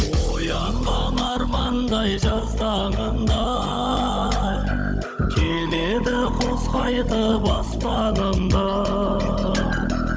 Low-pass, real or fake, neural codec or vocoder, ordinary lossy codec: none; fake; codec, 16 kHz, 16 kbps, FunCodec, trained on Chinese and English, 50 frames a second; none